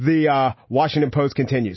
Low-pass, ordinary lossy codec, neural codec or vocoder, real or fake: 7.2 kHz; MP3, 24 kbps; none; real